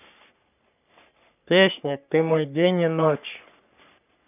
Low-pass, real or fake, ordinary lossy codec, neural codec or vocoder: 3.6 kHz; fake; none; codec, 44.1 kHz, 3.4 kbps, Pupu-Codec